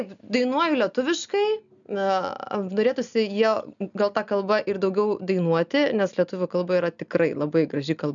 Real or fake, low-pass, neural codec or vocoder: real; 7.2 kHz; none